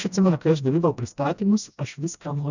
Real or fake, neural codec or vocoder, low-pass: fake; codec, 16 kHz, 1 kbps, FreqCodec, smaller model; 7.2 kHz